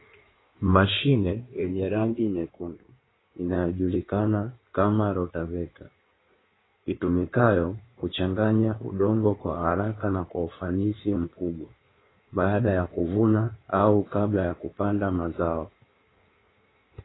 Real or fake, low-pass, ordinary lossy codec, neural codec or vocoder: fake; 7.2 kHz; AAC, 16 kbps; codec, 16 kHz in and 24 kHz out, 1.1 kbps, FireRedTTS-2 codec